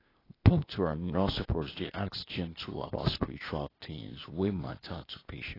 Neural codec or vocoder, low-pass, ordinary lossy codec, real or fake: codec, 16 kHz, 0.8 kbps, ZipCodec; 5.4 kHz; AAC, 24 kbps; fake